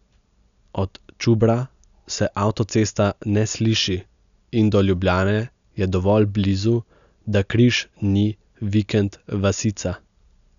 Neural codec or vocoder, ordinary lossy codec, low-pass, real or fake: none; none; 7.2 kHz; real